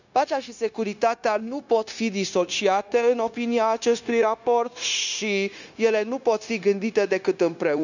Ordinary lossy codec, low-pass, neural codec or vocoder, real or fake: none; 7.2 kHz; codec, 16 kHz, 0.9 kbps, LongCat-Audio-Codec; fake